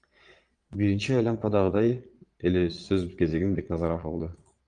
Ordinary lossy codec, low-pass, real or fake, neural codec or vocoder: Opus, 32 kbps; 9.9 kHz; fake; vocoder, 22.05 kHz, 80 mel bands, Vocos